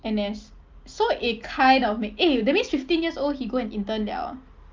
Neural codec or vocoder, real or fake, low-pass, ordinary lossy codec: none; real; 7.2 kHz; Opus, 24 kbps